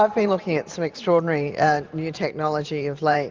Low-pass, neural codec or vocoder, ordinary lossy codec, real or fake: 7.2 kHz; vocoder, 44.1 kHz, 128 mel bands every 512 samples, BigVGAN v2; Opus, 32 kbps; fake